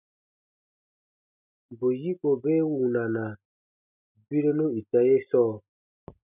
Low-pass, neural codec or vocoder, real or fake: 3.6 kHz; none; real